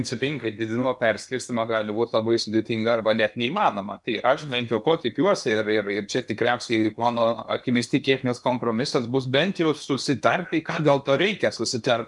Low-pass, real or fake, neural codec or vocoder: 10.8 kHz; fake; codec, 16 kHz in and 24 kHz out, 0.8 kbps, FocalCodec, streaming, 65536 codes